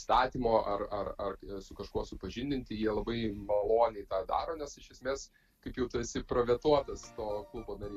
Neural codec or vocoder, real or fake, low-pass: none; real; 14.4 kHz